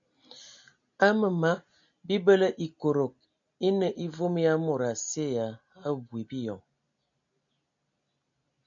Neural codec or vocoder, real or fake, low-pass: none; real; 7.2 kHz